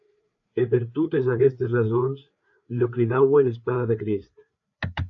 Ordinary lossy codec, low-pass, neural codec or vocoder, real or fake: AAC, 64 kbps; 7.2 kHz; codec, 16 kHz, 4 kbps, FreqCodec, larger model; fake